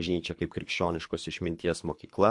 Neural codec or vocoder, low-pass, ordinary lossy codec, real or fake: codec, 24 kHz, 3 kbps, HILCodec; 10.8 kHz; MP3, 64 kbps; fake